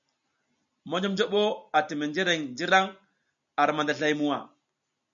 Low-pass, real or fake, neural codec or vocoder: 7.2 kHz; real; none